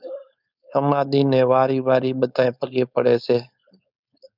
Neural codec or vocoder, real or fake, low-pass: codec, 16 kHz, 4.8 kbps, FACodec; fake; 5.4 kHz